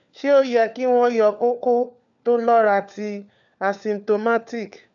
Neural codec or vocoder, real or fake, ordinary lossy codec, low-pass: codec, 16 kHz, 4 kbps, FunCodec, trained on LibriTTS, 50 frames a second; fake; none; 7.2 kHz